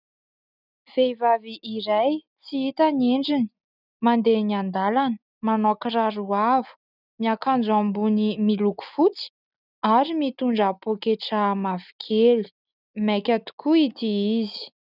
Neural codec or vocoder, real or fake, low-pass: none; real; 5.4 kHz